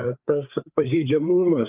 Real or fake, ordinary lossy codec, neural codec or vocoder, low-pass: fake; Opus, 24 kbps; codec, 16 kHz, 4 kbps, FreqCodec, larger model; 3.6 kHz